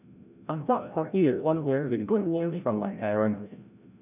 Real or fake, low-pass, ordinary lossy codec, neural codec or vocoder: fake; 3.6 kHz; none; codec, 16 kHz, 0.5 kbps, FreqCodec, larger model